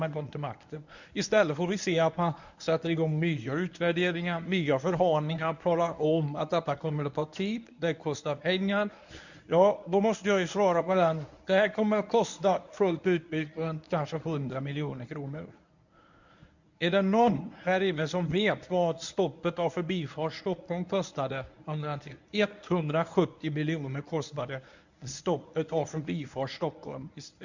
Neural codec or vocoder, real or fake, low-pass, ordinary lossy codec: codec, 24 kHz, 0.9 kbps, WavTokenizer, medium speech release version 1; fake; 7.2 kHz; none